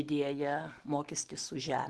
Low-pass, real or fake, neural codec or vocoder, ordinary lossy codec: 10.8 kHz; real; none; Opus, 16 kbps